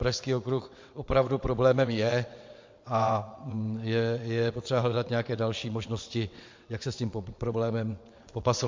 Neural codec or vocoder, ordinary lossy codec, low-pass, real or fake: vocoder, 22.05 kHz, 80 mel bands, WaveNeXt; MP3, 48 kbps; 7.2 kHz; fake